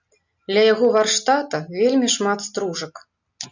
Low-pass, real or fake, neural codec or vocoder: 7.2 kHz; real; none